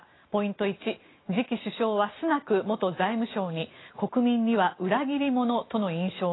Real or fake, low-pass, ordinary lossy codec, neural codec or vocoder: real; 7.2 kHz; AAC, 16 kbps; none